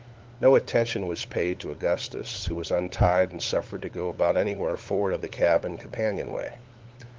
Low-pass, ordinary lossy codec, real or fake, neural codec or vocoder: 7.2 kHz; Opus, 16 kbps; fake; codec, 16 kHz, 8 kbps, FunCodec, trained on LibriTTS, 25 frames a second